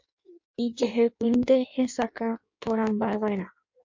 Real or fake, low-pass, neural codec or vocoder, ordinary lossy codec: fake; 7.2 kHz; codec, 16 kHz in and 24 kHz out, 1.1 kbps, FireRedTTS-2 codec; MP3, 48 kbps